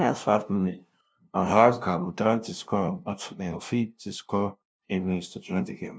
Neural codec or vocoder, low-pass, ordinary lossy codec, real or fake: codec, 16 kHz, 0.5 kbps, FunCodec, trained on LibriTTS, 25 frames a second; none; none; fake